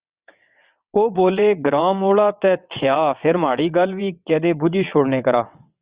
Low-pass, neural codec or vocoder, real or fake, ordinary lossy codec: 3.6 kHz; vocoder, 22.05 kHz, 80 mel bands, WaveNeXt; fake; Opus, 64 kbps